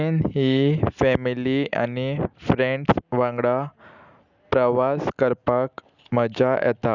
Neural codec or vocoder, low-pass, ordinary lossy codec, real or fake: none; 7.2 kHz; none; real